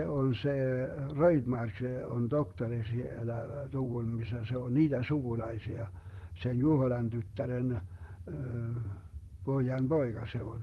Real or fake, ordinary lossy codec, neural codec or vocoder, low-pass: fake; Opus, 24 kbps; vocoder, 44.1 kHz, 128 mel bands, Pupu-Vocoder; 19.8 kHz